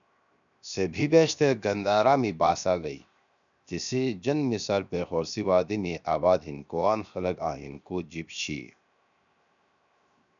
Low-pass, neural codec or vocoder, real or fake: 7.2 kHz; codec, 16 kHz, 0.7 kbps, FocalCodec; fake